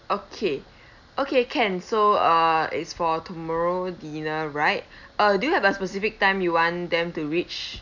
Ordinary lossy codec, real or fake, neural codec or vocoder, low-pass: none; real; none; 7.2 kHz